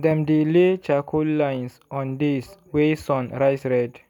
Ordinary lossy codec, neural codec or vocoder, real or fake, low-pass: none; none; real; 19.8 kHz